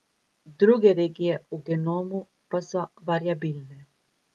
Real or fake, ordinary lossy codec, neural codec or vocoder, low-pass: real; Opus, 32 kbps; none; 19.8 kHz